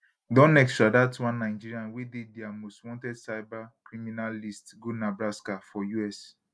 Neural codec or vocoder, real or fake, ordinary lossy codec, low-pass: none; real; none; none